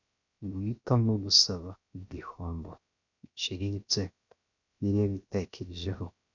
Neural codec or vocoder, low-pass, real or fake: codec, 16 kHz, 0.7 kbps, FocalCodec; 7.2 kHz; fake